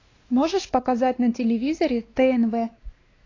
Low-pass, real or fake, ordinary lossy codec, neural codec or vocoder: 7.2 kHz; fake; AAC, 32 kbps; codec, 16 kHz, 2 kbps, X-Codec, WavLM features, trained on Multilingual LibriSpeech